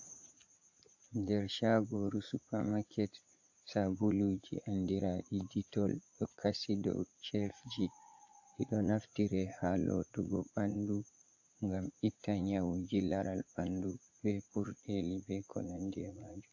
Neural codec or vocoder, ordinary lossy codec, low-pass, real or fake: vocoder, 44.1 kHz, 80 mel bands, Vocos; AAC, 48 kbps; 7.2 kHz; fake